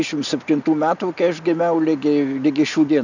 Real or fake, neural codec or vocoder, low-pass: real; none; 7.2 kHz